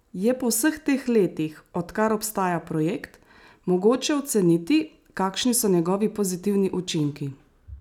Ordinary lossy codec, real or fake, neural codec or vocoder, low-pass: none; real; none; 19.8 kHz